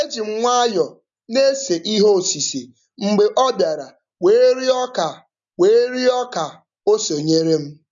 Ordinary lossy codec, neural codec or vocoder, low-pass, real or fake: none; none; 7.2 kHz; real